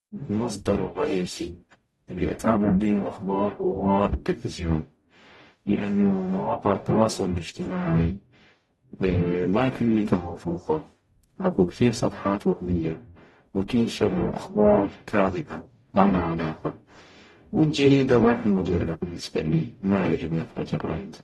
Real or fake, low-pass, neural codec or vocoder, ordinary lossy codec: fake; 19.8 kHz; codec, 44.1 kHz, 0.9 kbps, DAC; AAC, 32 kbps